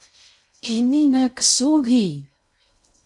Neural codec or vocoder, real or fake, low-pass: codec, 16 kHz in and 24 kHz out, 0.6 kbps, FocalCodec, streaming, 4096 codes; fake; 10.8 kHz